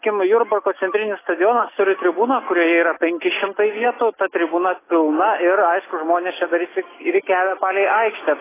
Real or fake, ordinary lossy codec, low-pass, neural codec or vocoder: real; AAC, 16 kbps; 3.6 kHz; none